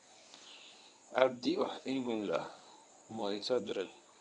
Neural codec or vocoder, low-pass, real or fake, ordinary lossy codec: codec, 24 kHz, 0.9 kbps, WavTokenizer, medium speech release version 1; 10.8 kHz; fake; none